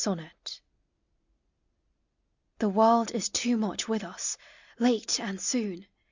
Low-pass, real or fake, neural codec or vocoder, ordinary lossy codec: 7.2 kHz; real; none; Opus, 64 kbps